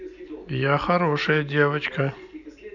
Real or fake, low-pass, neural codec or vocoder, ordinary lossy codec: real; 7.2 kHz; none; none